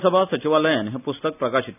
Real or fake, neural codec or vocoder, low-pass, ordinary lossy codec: real; none; 3.6 kHz; none